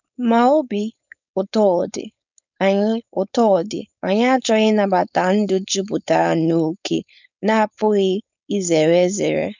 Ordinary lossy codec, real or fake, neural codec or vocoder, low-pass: none; fake; codec, 16 kHz, 4.8 kbps, FACodec; 7.2 kHz